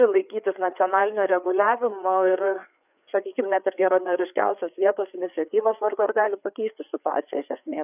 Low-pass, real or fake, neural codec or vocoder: 3.6 kHz; fake; codec, 16 kHz, 4 kbps, FreqCodec, larger model